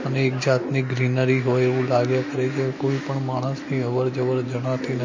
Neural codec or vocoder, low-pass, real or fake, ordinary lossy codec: none; 7.2 kHz; real; MP3, 32 kbps